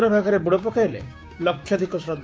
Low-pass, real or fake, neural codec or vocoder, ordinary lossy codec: none; fake; codec, 16 kHz, 6 kbps, DAC; none